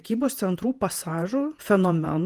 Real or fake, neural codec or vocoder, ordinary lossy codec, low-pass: fake; vocoder, 48 kHz, 128 mel bands, Vocos; Opus, 24 kbps; 14.4 kHz